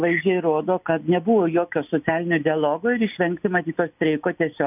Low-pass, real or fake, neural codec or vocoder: 3.6 kHz; real; none